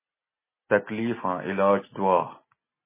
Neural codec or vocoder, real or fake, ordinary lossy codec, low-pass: none; real; MP3, 16 kbps; 3.6 kHz